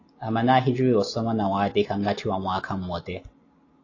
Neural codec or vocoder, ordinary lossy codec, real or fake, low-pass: none; AAC, 32 kbps; real; 7.2 kHz